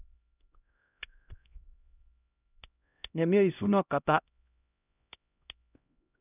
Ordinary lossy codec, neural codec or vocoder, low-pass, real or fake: none; codec, 16 kHz, 0.5 kbps, X-Codec, HuBERT features, trained on LibriSpeech; 3.6 kHz; fake